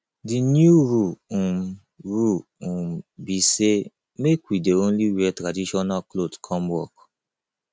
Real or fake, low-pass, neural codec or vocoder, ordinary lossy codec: real; none; none; none